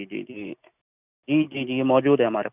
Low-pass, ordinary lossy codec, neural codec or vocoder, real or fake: 3.6 kHz; none; none; real